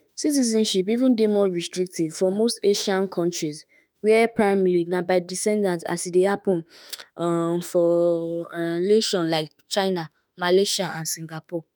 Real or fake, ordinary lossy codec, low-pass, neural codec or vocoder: fake; none; none; autoencoder, 48 kHz, 32 numbers a frame, DAC-VAE, trained on Japanese speech